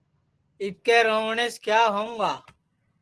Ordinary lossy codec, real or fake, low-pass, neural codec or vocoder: Opus, 16 kbps; real; 10.8 kHz; none